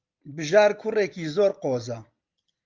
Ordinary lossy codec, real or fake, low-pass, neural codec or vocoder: Opus, 24 kbps; real; 7.2 kHz; none